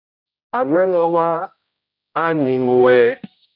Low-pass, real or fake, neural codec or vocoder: 5.4 kHz; fake; codec, 16 kHz, 0.5 kbps, X-Codec, HuBERT features, trained on general audio